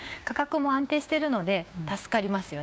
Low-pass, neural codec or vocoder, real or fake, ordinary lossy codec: none; codec, 16 kHz, 6 kbps, DAC; fake; none